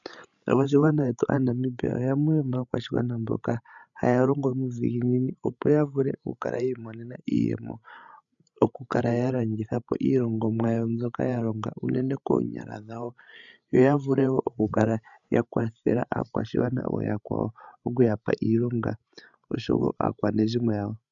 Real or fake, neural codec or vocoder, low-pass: fake; codec, 16 kHz, 16 kbps, FreqCodec, larger model; 7.2 kHz